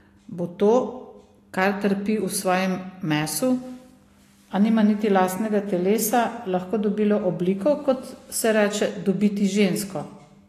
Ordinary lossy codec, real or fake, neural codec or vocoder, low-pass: AAC, 48 kbps; real; none; 14.4 kHz